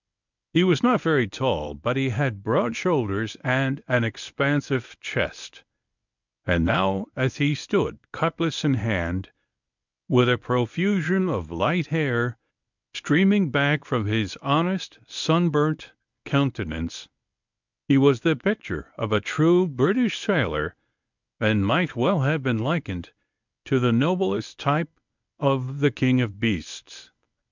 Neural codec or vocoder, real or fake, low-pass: codec, 24 kHz, 0.9 kbps, WavTokenizer, medium speech release version 1; fake; 7.2 kHz